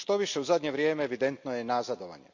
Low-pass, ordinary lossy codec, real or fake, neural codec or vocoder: 7.2 kHz; MP3, 64 kbps; real; none